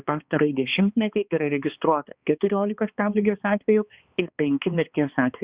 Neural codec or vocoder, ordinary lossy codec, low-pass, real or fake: codec, 16 kHz, 2 kbps, X-Codec, HuBERT features, trained on balanced general audio; Opus, 64 kbps; 3.6 kHz; fake